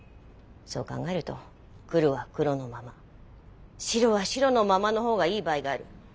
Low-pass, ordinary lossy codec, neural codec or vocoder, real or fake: none; none; none; real